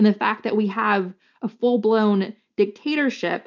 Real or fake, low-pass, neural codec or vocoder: real; 7.2 kHz; none